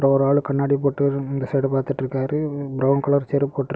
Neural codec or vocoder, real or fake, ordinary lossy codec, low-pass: none; real; none; none